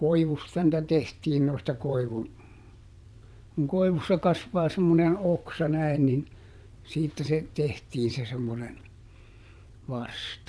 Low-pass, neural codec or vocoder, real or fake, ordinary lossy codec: none; vocoder, 22.05 kHz, 80 mel bands, WaveNeXt; fake; none